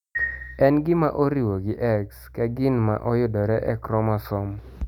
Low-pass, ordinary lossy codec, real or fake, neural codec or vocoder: 19.8 kHz; none; real; none